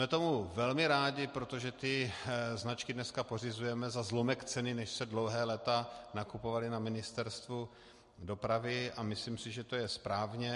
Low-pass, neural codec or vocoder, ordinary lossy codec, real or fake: 14.4 kHz; none; MP3, 48 kbps; real